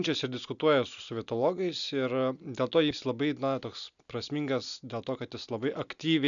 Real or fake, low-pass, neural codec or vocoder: real; 7.2 kHz; none